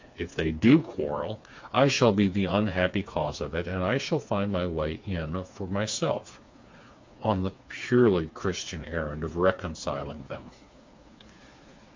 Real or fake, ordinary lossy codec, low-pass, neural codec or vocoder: fake; MP3, 48 kbps; 7.2 kHz; codec, 16 kHz, 4 kbps, FreqCodec, smaller model